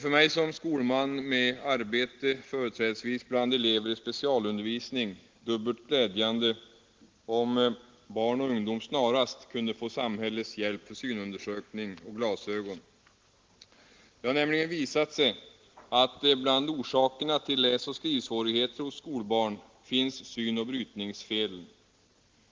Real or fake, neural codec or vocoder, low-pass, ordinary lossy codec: real; none; 7.2 kHz; Opus, 16 kbps